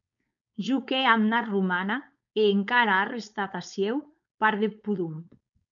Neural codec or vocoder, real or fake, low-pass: codec, 16 kHz, 4.8 kbps, FACodec; fake; 7.2 kHz